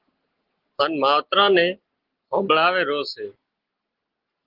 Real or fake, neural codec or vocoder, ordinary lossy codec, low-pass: real; none; Opus, 16 kbps; 5.4 kHz